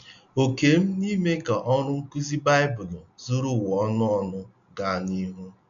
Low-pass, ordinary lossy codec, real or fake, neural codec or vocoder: 7.2 kHz; none; real; none